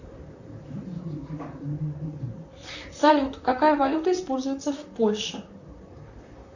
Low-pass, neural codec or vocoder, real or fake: 7.2 kHz; vocoder, 44.1 kHz, 128 mel bands, Pupu-Vocoder; fake